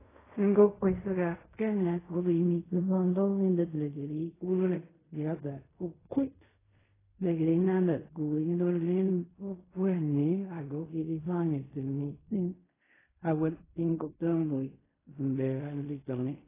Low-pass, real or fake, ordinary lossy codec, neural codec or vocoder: 3.6 kHz; fake; AAC, 16 kbps; codec, 16 kHz in and 24 kHz out, 0.4 kbps, LongCat-Audio-Codec, fine tuned four codebook decoder